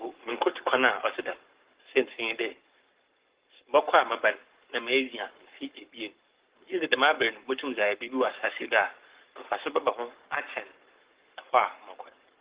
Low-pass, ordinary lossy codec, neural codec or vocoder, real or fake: 3.6 kHz; Opus, 16 kbps; codec, 44.1 kHz, 7.8 kbps, Pupu-Codec; fake